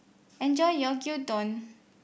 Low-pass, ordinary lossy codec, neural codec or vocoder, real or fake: none; none; none; real